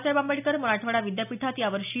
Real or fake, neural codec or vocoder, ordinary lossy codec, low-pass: real; none; none; 3.6 kHz